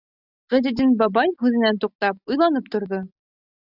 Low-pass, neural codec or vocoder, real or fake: 5.4 kHz; none; real